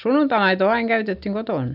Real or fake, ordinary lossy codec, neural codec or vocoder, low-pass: real; none; none; 5.4 kHz